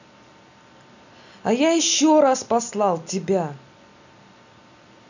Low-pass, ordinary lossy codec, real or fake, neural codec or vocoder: 7.2 kHz; none; real; none